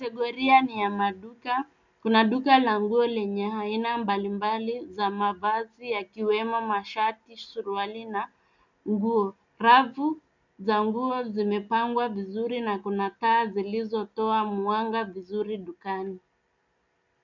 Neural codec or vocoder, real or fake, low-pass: none; real; 7.2 kHz